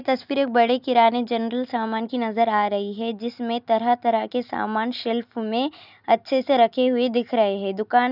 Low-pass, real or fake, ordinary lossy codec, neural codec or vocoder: 5.4 kHz; real; none; none